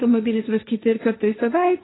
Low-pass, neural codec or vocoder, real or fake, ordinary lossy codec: 7.2 kHz; codec, 16 kHz, 1.1 kbps, Voila-Tokenizer; fake; AAC, 16 kbps